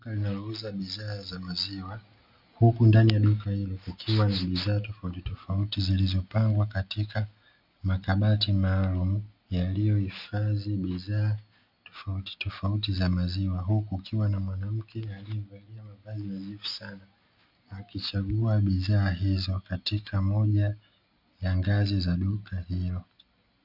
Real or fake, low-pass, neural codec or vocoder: real; 5.4 kHz; none